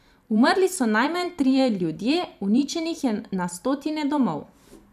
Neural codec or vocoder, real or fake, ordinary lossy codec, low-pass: vocoder, 44.1 kHz, 128 mel bands every 512 samples, BigVGAN v2; fake; none; 14.4 kHz